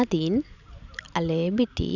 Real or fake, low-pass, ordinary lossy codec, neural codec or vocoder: real; 7.2 kHz; none; none